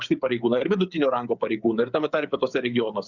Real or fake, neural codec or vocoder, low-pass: fake; codec, 16 kHz, 8 kbps, FreqCodec, smaller model; 7.2 kHz